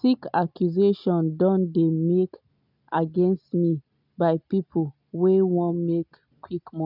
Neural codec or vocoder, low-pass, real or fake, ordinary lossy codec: none; 5.4 kHz; real; none